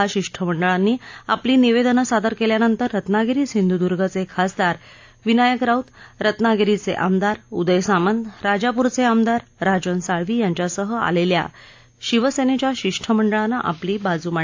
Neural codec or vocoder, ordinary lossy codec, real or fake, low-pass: none; AAC, 48 kbps; real; 7.2 kHz